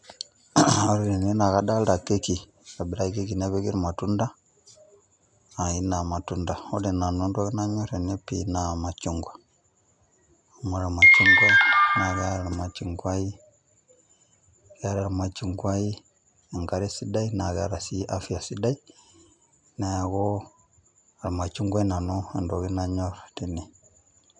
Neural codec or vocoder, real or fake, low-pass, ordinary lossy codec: none; real; 9.9 kHz; none